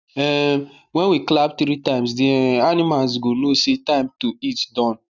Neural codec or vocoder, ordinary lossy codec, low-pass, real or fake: none; none; 7.2 kHz; real